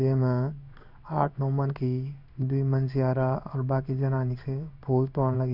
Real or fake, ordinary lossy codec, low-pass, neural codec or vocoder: fake; none; 5.4 kHz; codec, 16 kHz in and 24 kHz out, 1 kbps, XY-Tokenizer